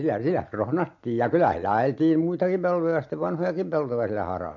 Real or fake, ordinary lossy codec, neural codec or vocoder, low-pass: real; MP3, 48 kbps; none; 7.2 kHz